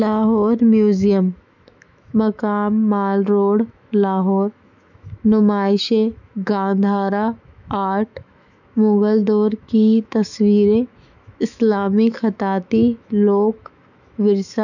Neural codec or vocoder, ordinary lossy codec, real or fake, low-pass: autoencoder, 48 kHz, 128 numbers a frame, DAC-VAE, trained on Japanese speech; none; fake; 7.2 kHz